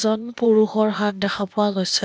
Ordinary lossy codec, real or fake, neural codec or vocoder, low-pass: none; fake; codec, 16 kHz, 0.8 kbps, ZipCodec; none